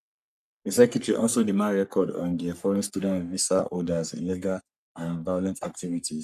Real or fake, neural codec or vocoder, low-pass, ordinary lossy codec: fake; codec, 44.1 kHz, 3.4 kbps, Pupu-Codec; 14.4 kHz; none